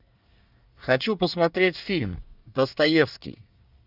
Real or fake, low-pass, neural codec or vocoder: fake; 5.4 kHz; codec, 24 kHz, 1 kbps, SNAC